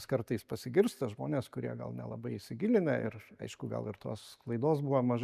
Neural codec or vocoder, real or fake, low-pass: none; real; 14.4 kHz